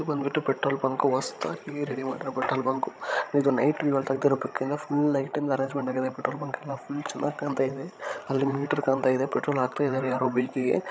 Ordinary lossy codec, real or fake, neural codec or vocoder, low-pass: none; fake; codec, 16 kHz, 16 kbps, FreqCodec, larger model; none